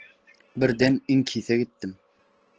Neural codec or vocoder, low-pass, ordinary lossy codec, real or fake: none; 7.2 kHz; Opus, 16 kbps; real